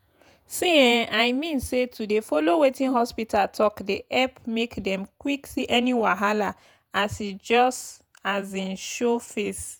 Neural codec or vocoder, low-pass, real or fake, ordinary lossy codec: vocoder, 48 kHz, 128 mel bands, Vocos; none; fake; none